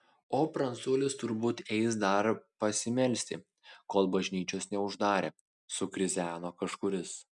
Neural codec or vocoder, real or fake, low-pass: none; real; 10.8 kHz